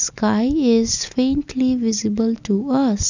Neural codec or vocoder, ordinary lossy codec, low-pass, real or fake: none; none; 7.2 kHz; real